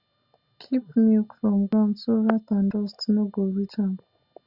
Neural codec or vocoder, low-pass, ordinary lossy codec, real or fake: none; 5.4 kHz; Opus, 64 kbps; real